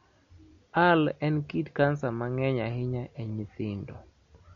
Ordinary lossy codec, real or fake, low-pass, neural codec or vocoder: MP3, 48 kbps; real; 7.2 kHz; none